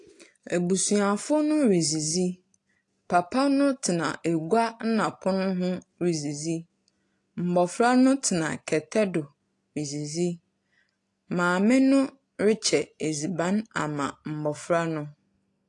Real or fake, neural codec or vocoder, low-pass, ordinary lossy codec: real; none; 10.8 kHz; AAC, 48 kbps